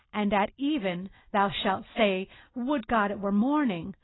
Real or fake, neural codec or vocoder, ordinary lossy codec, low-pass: real; none; AAC, 16 kbps; 7.2 kHz